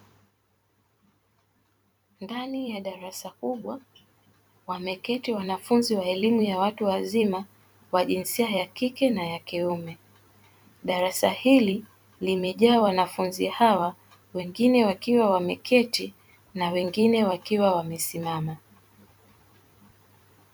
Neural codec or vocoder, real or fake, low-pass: vocoder, 44.1 kHz, 128 mel bands every 256 samples, BigVGAN v2; fake; 19.8 kHz